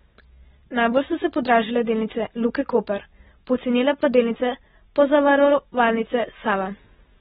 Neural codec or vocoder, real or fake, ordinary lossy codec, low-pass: none; real; AAC, 16 kbps; 19.8 kHz